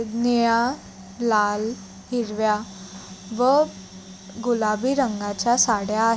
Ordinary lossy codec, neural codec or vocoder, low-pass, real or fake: none; none; none; real